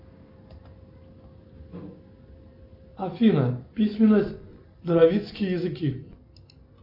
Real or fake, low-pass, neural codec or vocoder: real; 5.4 kHz; none